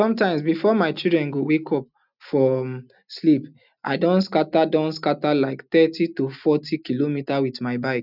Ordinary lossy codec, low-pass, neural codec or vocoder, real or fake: none; 5.4 kHz; none; real